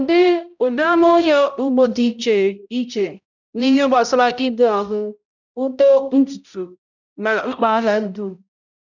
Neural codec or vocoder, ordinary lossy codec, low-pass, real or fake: codec, 16 kHz, 0.5 kbps, X-Codec, HuBERT features, trained on balanced general audio; none; 7.2 kHz; fake